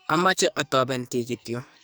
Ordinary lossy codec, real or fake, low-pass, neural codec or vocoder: none; fake; none; codec, 44.1 kHz, 2.6 kbps, SNAC